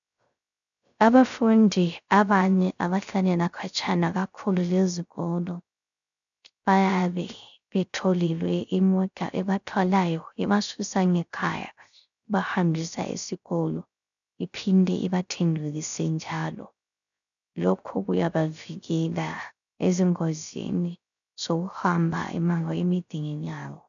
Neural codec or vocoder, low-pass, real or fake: codec, 16 kHz, 0.3 kbps, FocalCodec; 7.2 kHz; fake